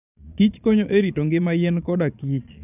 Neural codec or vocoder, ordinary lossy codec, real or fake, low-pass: vocoder, 22.05 kHz, 80 mel bands, Vocos; none; fake; 3.6 kHz